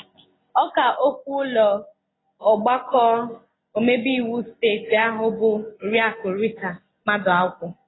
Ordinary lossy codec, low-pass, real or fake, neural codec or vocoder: AAC, 16 kbps; 7.2 kHz; real; none